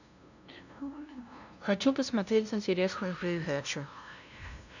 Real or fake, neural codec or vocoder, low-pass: fake; codec, 16 kHz, 0.5 kbps, FunCodec, trained on LibriTTS, 25 frames a second; 7.2 kHz